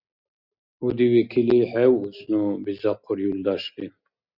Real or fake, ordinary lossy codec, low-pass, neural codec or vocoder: real; Opus, 64 kbps; 5.4 kHz; none